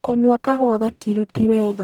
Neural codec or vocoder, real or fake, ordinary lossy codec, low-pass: codec, 44.1 kHz, 0.9 kbps, DAC; fake; none; 19.8 kHz